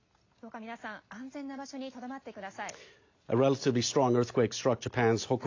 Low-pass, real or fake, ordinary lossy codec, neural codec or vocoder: 7.2 kHz; real; AAC, 48 kbps; none